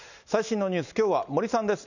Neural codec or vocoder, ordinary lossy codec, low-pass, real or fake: none; none; 7.2 kHz; real